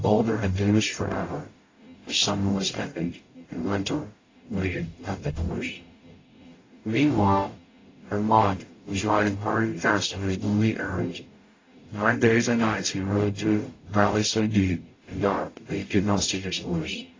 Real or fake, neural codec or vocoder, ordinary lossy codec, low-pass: fake; codec, 44.1 kHz, 0.9 kbps, DAC; AAC, 32 kbps; 7.2 kHz